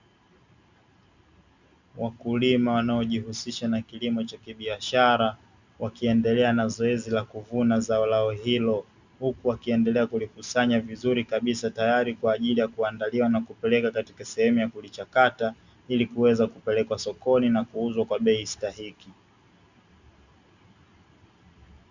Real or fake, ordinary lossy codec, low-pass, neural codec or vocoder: real; Opus, 64 kbps; 7.2 kHz; none